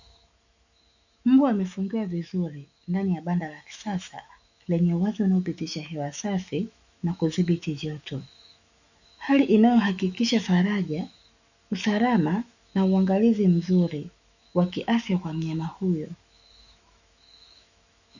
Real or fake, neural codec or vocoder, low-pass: fake; autoencoder, 48 kHz, 128 numbers a frame, DAC-VAE, trained on Japanese speech; 7.2 kHz